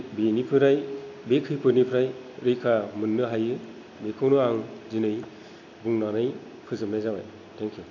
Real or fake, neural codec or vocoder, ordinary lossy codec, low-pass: real; none; AAC, 48 kbps; 7.2 kHz